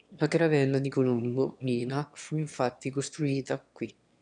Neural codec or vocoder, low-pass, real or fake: autoencoder, 22.05 kHz, a latent of 192 numbers a frame, VITS, trained on one speaker; 9.9 kHz; fake